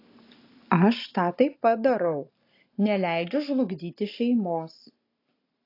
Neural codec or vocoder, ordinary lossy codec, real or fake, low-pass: none; AAC, 32 kbps; real; 5.4 kHz